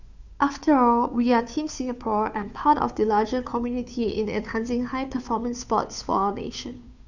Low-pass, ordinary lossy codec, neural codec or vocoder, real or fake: 7.2 kHz; none; codec, 16 kHz, 2 kbps, FunCodec, trained on Chinese and English, 25 frames a second; fake